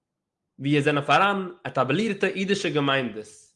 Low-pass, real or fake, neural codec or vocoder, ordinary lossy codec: 10.8 kHz; real; none; Opus, 32 kbps